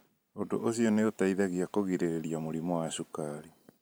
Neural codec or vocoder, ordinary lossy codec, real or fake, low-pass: vocoder, 44.1 kHz, 128 mel bands every 512 samples, BigVGAN v2; none; fake; none